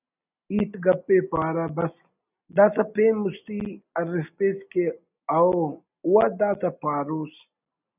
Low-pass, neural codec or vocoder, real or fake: 3.6 kHz; none; real